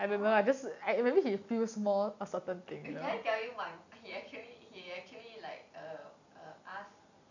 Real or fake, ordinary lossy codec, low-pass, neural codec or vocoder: real; none; 7.2 kHz; none